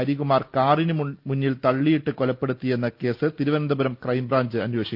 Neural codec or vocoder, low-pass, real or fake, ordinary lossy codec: none; 5.4 kHz; real; Opus, 24 kbps